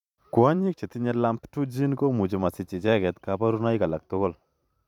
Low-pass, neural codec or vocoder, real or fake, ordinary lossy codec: 19.8 kHz; vocoder, 44.1 kHz, 128 mel bands every 512 samples, BigVGAN v2; fake; none